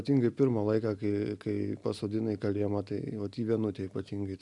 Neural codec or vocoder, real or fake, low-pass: none; real; 10.8 kHz